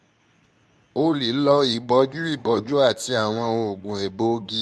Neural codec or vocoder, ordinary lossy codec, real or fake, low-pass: codec, 24 kHz, 0.9 kbps, WavTokenizer, medium speech release version 2; none; fake; none